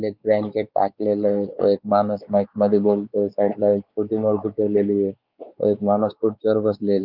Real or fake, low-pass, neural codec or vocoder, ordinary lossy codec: fake; 5.4 kHz; codec, 16 kHz, 4 kbps, X-Codec, WavLM features, trained on Multilingual LibriSpeech; Opus, 32 kbps